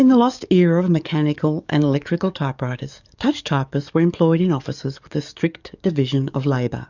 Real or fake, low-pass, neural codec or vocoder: fake; 7.2 kHz; codec, 44.1 kHz, 7.8 kbps, DAC